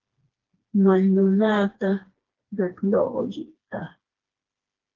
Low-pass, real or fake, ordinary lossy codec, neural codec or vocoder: 7.2 kHz; fake; Opus, 16 kbps; codec, 16 kHz, 2 kbps, FreqCodec, smaller model